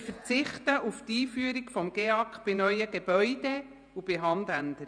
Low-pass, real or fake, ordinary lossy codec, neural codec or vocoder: 9.9 kHz; fake; none; vocoder, 48 kHz, 128 mel bands, Vocos